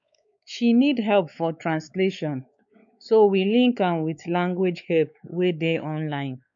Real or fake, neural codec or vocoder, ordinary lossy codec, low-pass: fake; codec, 16 kHz, 4 kbps, X-Codec, WavLM features, trained on Multilingual LibriSpeech; MP3, 64 kbps; 7.2 kHz